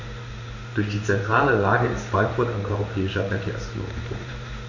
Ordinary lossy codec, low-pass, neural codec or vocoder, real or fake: none; 7.2 kHz; codec, 16 kHz in and 24 kHz out, 1 kbps, XY-Tokenizer; fake